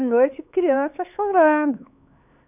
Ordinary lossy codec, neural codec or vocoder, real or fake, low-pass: none; codec, 16 kHz, 4 kbps, X-Codec, HuBERT features, trained on LibriSpeech; fake; 3.6 kHz